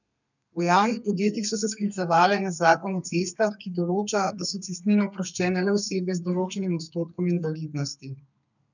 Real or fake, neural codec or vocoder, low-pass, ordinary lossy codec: fake; codec, 32 kHz, 1.9 kbps, SNAC; 7.2 kHz; none